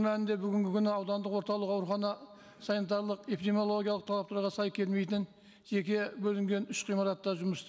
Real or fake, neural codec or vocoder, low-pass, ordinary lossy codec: real; none; none; none